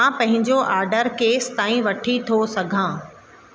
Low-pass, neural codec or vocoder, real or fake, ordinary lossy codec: none; none; real; none